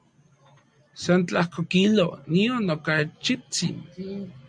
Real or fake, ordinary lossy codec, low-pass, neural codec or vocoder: real; MP3, 64 kbps; 9.9 kHz; none